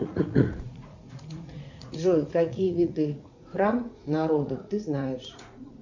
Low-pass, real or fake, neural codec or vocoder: 7.2 kHz; fake; vocoder, 44.1 kHz, 80 mel bands, Vocos